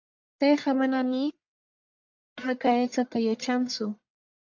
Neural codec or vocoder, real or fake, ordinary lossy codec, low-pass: codec, 44.1 kHz, 1.7 kbps, Pupu-Codec; fake; MP3, 64 kbps; 7.2 kHz